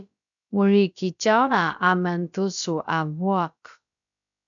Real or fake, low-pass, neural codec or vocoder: fake; 7.2 kHz; codec, 16 kHz, about 1 kbps, DyCAST, with the encoder's durations